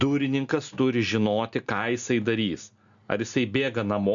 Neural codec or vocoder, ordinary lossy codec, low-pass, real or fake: none; MP3, 96 kbps; 7.2 kHz; real